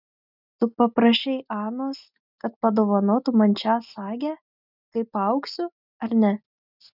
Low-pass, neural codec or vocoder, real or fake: 5.4 kHz; none; real